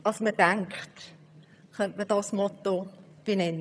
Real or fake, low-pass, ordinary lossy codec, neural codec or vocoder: fake; none; none; vocoder, 22.05 kHz, 80 mel bands, HiFi-GAN